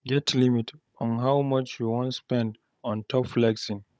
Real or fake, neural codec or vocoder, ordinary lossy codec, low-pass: fake; codec, 16 kHz, 16 kbps, FunCodec, trained on Chinese and English, 50 frames a second; none; none